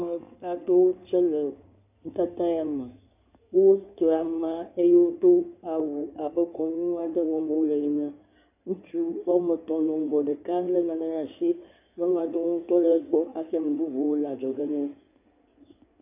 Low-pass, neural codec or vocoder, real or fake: 3.6 kHz; codec, 16 kHz in and 24 kHz out, 2.2 kbps, FireRedTTS-2 codec; fake